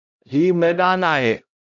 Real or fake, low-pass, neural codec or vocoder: fake; 7.2 kHz; codec, 16 kHz, 0.5 kbps, X-Codec, HuBERT features, trained on LibriSpeech